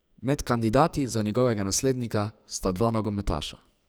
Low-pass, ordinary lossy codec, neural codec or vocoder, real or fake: none; none; codec, 44.1 kHz, 2.6 kbps, SNAC; fake